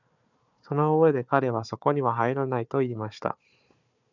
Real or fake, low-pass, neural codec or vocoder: fake; 7.2 kHz; codec, 16 kHz, 4 kbps, FunCodec, trained on Chinese and English, 50 frames a second